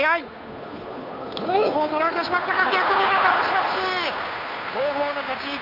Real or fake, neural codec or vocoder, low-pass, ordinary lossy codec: fake; codec, 16 kHz, 2 kbps, FunCodec, trained on Chinese and English, 25 frames a second; 5.4 kHz; none